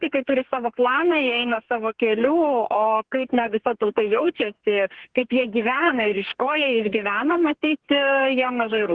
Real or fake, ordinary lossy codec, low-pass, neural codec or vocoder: fake; Opus, 16 kbps; 9.9 kHz; codec, 32 kHz, 1.9 kbps, SNAC